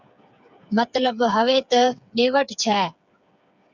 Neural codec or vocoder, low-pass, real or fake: codec, 16 kHz, 8 kbps, FreqCodec, smaller model; 7.2 kHz; fake